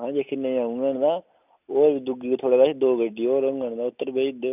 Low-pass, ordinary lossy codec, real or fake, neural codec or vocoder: 3.6 kHz; none; real; none